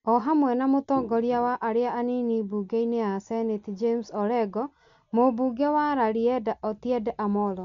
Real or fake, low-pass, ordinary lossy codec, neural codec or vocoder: real; 7.2 kHz; MP3, 64 kbps; none